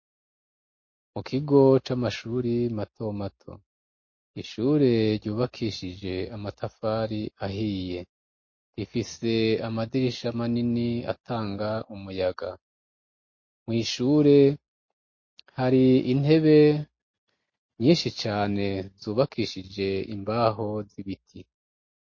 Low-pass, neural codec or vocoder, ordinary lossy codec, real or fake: 7.2 kHz; none; MP3, 32 kbps; real